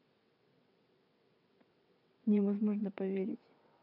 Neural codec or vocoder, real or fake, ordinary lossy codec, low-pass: vocoder, 22.05 kHz, 80 mel bands, WaveNeXt; fake; none; 5.4 kHz